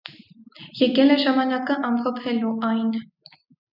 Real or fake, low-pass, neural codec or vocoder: real; 5.4 kHz; none